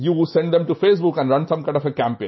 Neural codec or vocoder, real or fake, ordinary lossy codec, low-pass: none; real; MP3, 24 kbps; 7.2 kHz